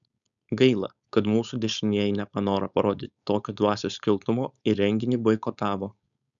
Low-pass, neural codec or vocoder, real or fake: 7.2 kHz; codec, 16 kHz, 4.8 kbps, FACodec; fake